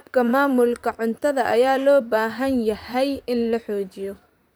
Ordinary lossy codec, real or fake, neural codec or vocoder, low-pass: none; fake; vocoder, 44.1 kHz, 128 mel bands, Pupu-Vocoder; none